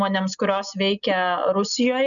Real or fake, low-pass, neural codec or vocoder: real; 7.2 kHz; none